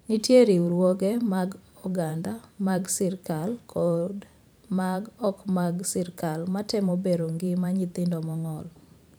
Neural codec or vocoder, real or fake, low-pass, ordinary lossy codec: vocoder, 44.1 kHz, 128 mel bands every 512 samples, BigVGAN v2; fake; none; none